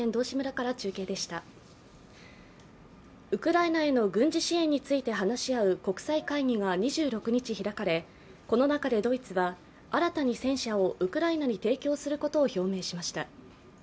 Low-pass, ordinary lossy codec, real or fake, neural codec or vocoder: none; none; real; none